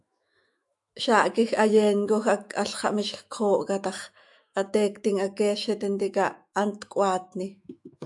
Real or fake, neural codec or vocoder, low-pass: fake; autoencoder, 48 kHz, 128 numbers a frame, DAC-VAE, trained on Japanese speech; 10.8 kHz